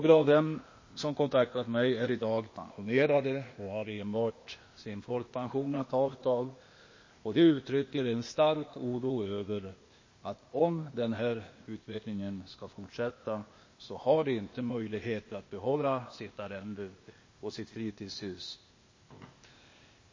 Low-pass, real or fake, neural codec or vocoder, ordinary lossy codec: 7.2 kHz; fake; codec, 16 kHz, 0.8 kbps, ZipCodec; MP3, 32 kbps